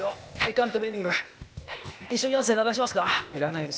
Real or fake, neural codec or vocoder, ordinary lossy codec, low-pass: fake; codec, 16 kHz, 0.8 kbps, ZipCodec; none; none